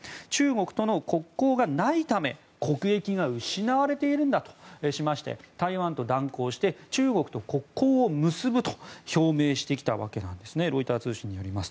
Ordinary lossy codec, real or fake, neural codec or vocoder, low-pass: none; real; none; none